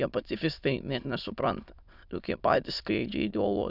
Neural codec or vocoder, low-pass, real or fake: autoencoder, 22.05 kHz, a latent of 192 numbers a frame, VITS, trained on many speakers; 5.4 kHz; fake